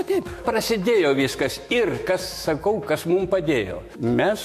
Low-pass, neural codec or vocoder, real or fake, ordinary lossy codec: 14.4 kHz; autoencoder, 48 kHz, 128 numbers a frame, DAC-VAE, trained on Japanese speech; fake; MP3, 64 kbps